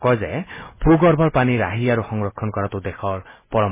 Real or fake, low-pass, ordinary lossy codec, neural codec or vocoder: real; 3.6 kHz; MP3, 16 kbps; none